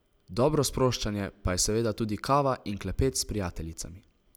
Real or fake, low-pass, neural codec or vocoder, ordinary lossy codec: real; none; none; none